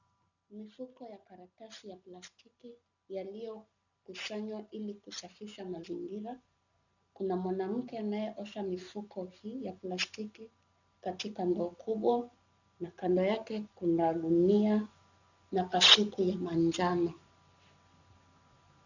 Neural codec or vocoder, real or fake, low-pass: none; real; 7.2 kHz